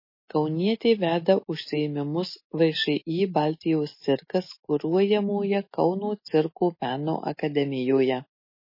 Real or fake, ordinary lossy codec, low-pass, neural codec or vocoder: real; MP3, 24 kbps; 5.4 kHz; none